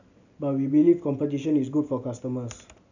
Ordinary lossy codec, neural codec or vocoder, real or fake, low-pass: none; none; real; 7.2 kHz